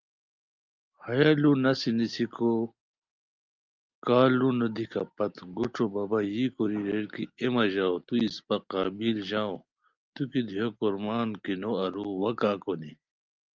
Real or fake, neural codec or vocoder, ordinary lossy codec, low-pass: real; none; Opus, 24 kbps; 7.2 kHz